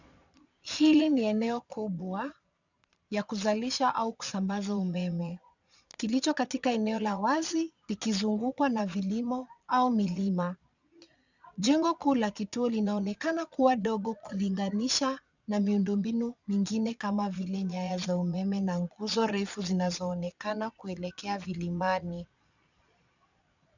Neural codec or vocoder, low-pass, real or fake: vocoder, 22.05 kHz, 80 mel bands, Vocos; 7.2 kHz; fake